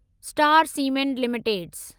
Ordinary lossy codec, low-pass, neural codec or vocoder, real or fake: Opus, 32 kbps; 19.8 kHz; none; real